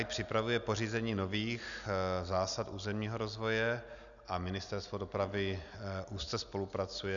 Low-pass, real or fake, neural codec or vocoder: 7.2 kHz; real; none